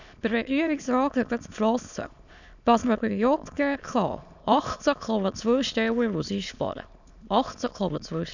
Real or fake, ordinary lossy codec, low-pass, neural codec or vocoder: fake; none; 7.2 kHz; autoencoder, 22.05 kHz, a latent of 192 numbers a frame, VITS, trained on many speakers